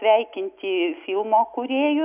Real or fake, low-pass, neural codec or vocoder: real; 3.6 kHz; none